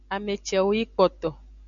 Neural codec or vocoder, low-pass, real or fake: none; 7.2 kHz; real